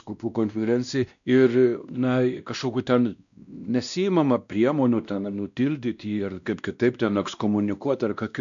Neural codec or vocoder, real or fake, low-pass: codec, 16 kHz, 1 kbps, X-Codec, WavLM features, trained on Multilingual LibriSpeech; fake; 7.2 kHz